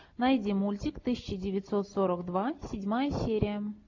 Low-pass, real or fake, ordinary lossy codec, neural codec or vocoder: 7.2 kHz; real; AAC, 48 kbps; none